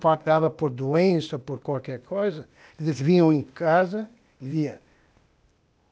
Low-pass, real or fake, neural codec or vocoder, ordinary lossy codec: none; fake; codec, 16 kHz, 0.8 kbps, ZipCodec; none